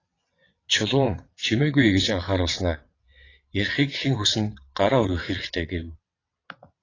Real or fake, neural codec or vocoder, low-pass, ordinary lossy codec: fake; vocoder, 22.05 kHz, 80 mel bands, WaveNeXt; 7.2 kHz; AAC, 32 kbps